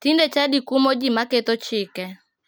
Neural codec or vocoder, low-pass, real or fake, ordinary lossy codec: none; none; real; none